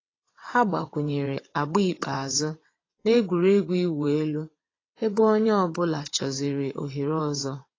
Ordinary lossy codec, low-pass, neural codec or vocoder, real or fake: AAC, 32 kbps; 7.2 kHz; vocoder, 22.05 kHz, 80 mel bands, WaveNeXt; fake